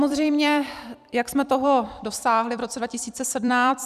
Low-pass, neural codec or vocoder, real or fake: 14.4 kHz; vocoder, 44.1 kHz, 128 mel bands every 256 samples, BigVGAN v2; fake